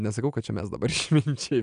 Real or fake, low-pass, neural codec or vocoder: real; 9.9 kHz; none